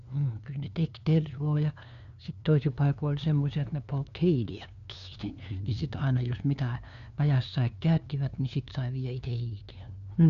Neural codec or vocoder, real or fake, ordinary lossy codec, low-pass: codec, 16 kHz, 2 kbps, FunCodec, trained on LibriTTS, 25 frames a second; fake; none; 7.2 kHz